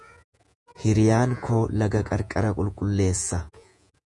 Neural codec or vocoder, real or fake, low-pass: vocoder, 48 kHz, 128 mel bands, Vocos; fake; 10.8 kHz